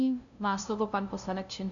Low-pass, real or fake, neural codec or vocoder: 7.2 kHz; fake; codec, 16 kHz, 0.5 kbps, FunCodec, trained on LibriTTS, 25 frames a second